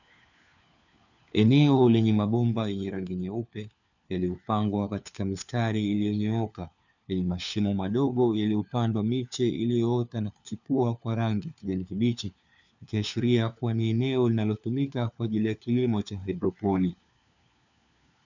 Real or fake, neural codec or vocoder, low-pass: fake; codec, 16 kHz, 4 kbps, FunCodec, trained on LibriTTS, 50 frames a second; 7.2 kHz